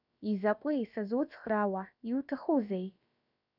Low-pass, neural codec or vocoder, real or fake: 5.4 kHz; codec, 16 kHz, about 1 kbps, DyCAST, with the encoder's durations; fake